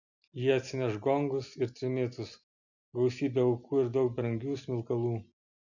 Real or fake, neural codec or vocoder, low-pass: real; none; 7.2 kHz